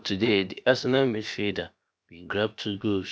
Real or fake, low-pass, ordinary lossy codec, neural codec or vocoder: fake; none; none; codec, 16 kHz, about 1 kbps, DyCAST, with the encoder's durations